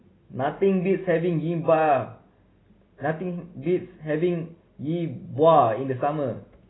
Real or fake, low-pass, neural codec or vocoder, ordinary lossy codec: real; 7.2 kHz; none; AAC, 16 kbps